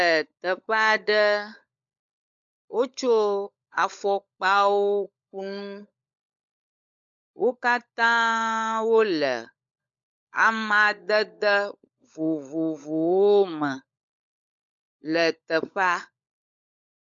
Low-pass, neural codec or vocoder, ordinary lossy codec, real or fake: 7.2 kHz; codec, 16 kHz, 16 kbps, FunCodec, trained on LibriTTS, 50 frames a second; MP3, 64 kbps; fake